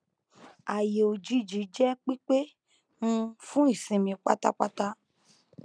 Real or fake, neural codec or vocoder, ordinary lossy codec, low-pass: real; none; none; 9.9 kHz